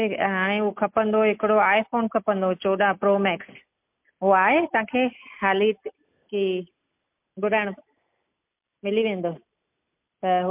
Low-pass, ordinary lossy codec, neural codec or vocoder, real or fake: 3.6 kHz; MP3, 32 kbps; none; real